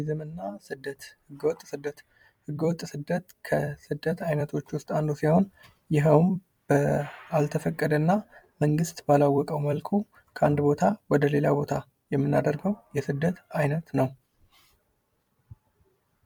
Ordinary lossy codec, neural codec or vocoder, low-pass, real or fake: MP3, 96 kbps; vocoder, 44.1 kHz, 128 mel bands every 256 samples, BigVGAN v2; 19.8 kHz; fake